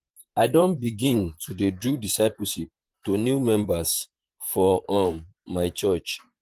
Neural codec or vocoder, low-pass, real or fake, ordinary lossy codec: vocoder, 44.1 kHz, 128 mel bands, Pupu-Vocoder; 14.4 kHz; fake; Opus, 24 kbps